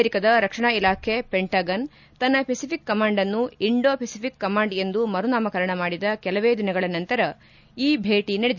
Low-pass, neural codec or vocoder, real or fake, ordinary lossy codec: 7.2 kHz; none; real; none